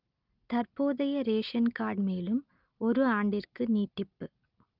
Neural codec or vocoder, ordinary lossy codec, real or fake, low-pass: none; Opus, 32 kbps; real; 5.4 kHz